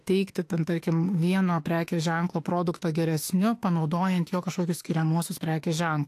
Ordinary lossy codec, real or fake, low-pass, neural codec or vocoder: AAC, 64 kbps; fake; 14.4 kHz; autoencoder, 48 kHz, 32 numbers a frame, DAC-VAE, trained on Japanese speech